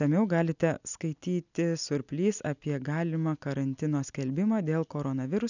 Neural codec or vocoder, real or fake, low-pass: none; real; 7.2 kHz